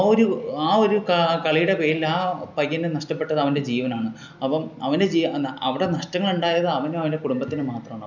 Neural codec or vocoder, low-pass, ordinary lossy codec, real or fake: none; 7.2 kHz; none; real